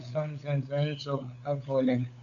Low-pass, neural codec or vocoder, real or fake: 7.2 kHz; codec, 16 kHz, 8 kbps, FunCodec, trained on LibriTTS, 25 frames a second; fake